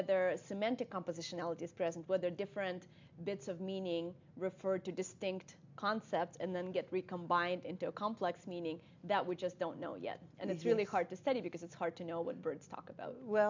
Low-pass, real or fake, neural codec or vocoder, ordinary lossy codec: 7.2 kHz; real; none; MP3, 64 kbps